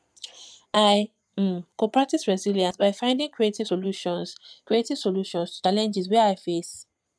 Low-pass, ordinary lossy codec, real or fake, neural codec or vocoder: none; none; fake; vocoder, 22.05 kHz, 80 mel bands, Vocos